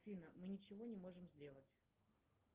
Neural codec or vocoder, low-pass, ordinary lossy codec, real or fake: none; 3.6 kHz; Opus, 16 kbps; real